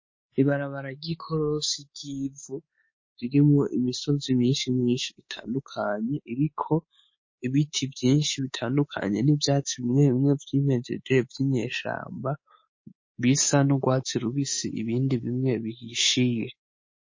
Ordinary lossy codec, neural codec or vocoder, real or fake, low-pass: MP3, 32 kbps; codec, 24 kHz, 3.1 kbps, DualCodec; fake; 7.2 kHz